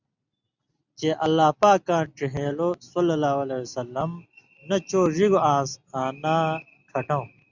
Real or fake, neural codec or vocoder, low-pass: real; none; 7.2 kHz